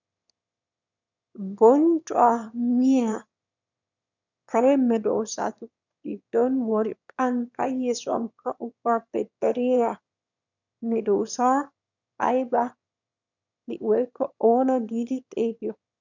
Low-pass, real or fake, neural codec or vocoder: 7.2 kHz; fake; autoencoder, 22.05 kHz, a latent of 192 numbers a frame, VITS, trained on one speaker